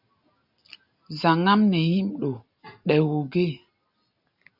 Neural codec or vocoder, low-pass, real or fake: none; 5.4 kHz; real